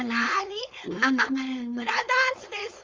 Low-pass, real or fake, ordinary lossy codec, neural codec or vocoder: 7.2 kHz; fake; Opus, 32 kbps; codec, 16 kHz, 4.8 kbps, FACodec